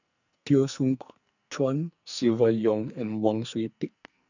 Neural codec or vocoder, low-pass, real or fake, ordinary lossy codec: codec, 44.1 kHz, 2.6 kbps, SNAC; 7.2 kHz; fake; none